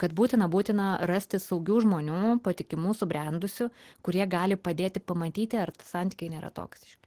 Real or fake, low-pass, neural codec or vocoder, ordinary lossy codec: real; 14.4 kHz; none; Opus, 16 kbps